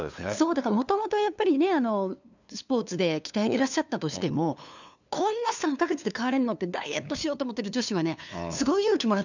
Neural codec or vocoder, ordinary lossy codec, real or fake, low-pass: codec, 16 kHz, 2 kbps, FunCodec, trained on LibriTTS, 25 frames a second; none; fake; 7.2 kHz